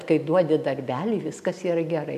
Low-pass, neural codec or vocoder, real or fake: 14.4 kHz; none; real